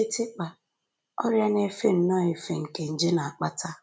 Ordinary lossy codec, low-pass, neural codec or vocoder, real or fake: none; none; none; real